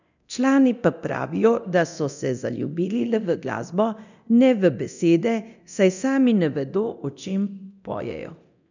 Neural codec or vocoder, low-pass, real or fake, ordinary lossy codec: codec, 24 kHz, 0.9 kbps, DualCodec; 7.2 kHz; fake; none